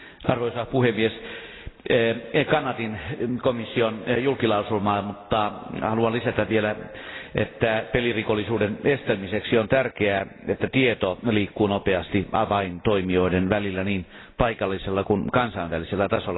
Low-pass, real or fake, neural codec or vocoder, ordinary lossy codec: 7.2 kHz; real; none; AAC, 16 kbps